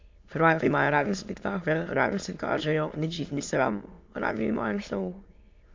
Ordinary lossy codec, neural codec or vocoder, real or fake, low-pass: MP3, 48 kbps; autoencoder, 22.05 kHz, a latent of 192 numbers a frame, VITS, trained on many speakers; fake; 7.2 kHz